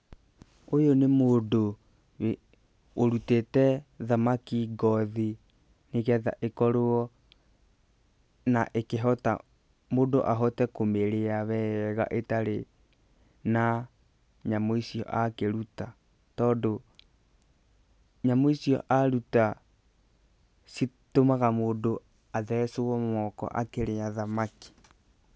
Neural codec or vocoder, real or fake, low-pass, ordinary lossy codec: none; real; none; none